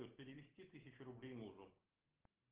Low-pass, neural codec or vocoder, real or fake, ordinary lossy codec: 3.6 kHz; none; real; Opus, 16 kbps